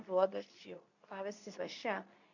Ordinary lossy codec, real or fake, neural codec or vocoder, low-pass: none; fake; codec, 24 kHz, 0.9 kbps, WavTokenizer, medium speech release version 2; 7.2 kHz